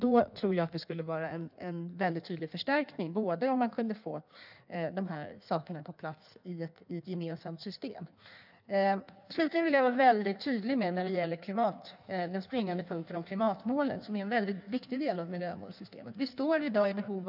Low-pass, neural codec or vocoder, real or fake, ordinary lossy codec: 5.4 kHz; codec, 16 kHz in and 24 kHz out, 1.1 kbps, FireRedTTS-2 codec; fake; none